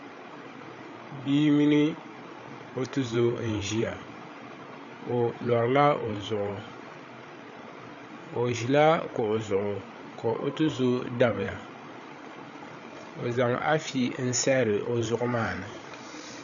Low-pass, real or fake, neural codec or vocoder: 7.2 kHz; fake; codec, 16 kHz, 8 kbps, FreqCodec, larger model